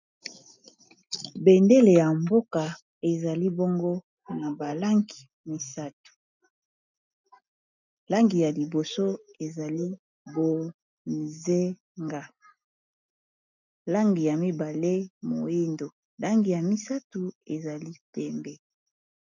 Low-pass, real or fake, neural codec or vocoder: 7.2 kHz; real; none